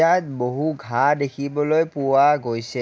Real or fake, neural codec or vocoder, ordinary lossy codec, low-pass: real; none; none; none